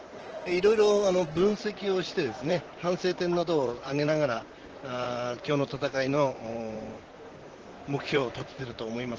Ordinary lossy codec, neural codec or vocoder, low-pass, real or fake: Opus, 16 kbps; vocoder, 44.1 kHz, 128 mel bands, Pupu-Vocoder; 7.2 kHz; fake